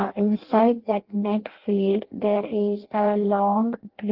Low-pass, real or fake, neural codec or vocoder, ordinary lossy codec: 5.4 kHz; fake; codec, 16 kHz in and 24 kHz out, 0.6 kbps, FireRedTTS-2 codec; Opus, 16 kbps